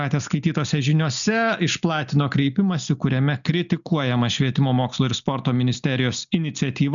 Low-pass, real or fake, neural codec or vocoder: 7.2 kHz; real; none